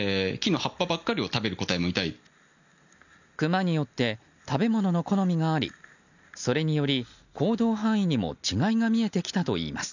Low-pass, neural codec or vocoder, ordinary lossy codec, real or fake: 7.2 kHz; none; none; real